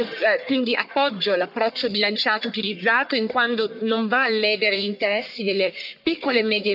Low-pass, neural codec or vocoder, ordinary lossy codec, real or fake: 5.4 kHz; codec, 44.1 kHz, 1.7 kbps, Pupu-Codec; AAC, 48 kbps; fake